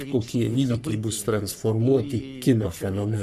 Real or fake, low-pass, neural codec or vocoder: fake; 14.4 kHz; codec, 44.1 kHz, 3.4 kbps, Pupu-Codec